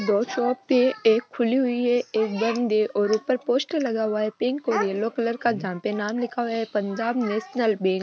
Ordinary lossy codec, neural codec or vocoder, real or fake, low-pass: none; none; real; none